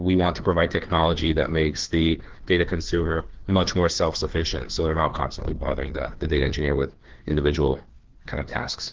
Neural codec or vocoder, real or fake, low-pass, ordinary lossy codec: codec, 16 kHz, 2 kbps, FreqCodec, larger model; fake; 7.2 kHz; Opus, 16 kbps